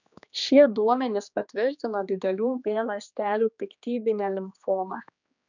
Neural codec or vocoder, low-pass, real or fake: codec, 16 kHz, 2 kbps, X-Codec, HuBERT features, trained on general audio; 7.2 kHz; fake